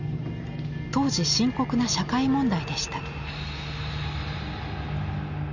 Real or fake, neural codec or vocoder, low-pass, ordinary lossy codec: real; none; 7.2 kHz; none